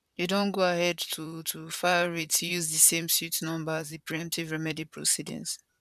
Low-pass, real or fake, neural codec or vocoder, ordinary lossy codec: 14.4 kHz; fake; vocoder, 44.1 kHz, 128 mel bands, Pupu-Vocoder; none